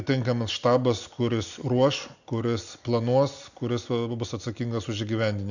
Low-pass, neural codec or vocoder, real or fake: 7.2 kHz; none; real